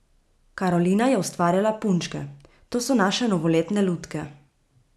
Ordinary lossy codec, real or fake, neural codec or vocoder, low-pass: none; real; none; none